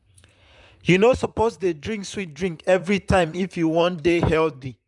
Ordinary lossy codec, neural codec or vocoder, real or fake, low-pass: none; vocoder, 44.1 kHz, 128 mel bands, Pupu-Vocoder; fake; 10.8 kHz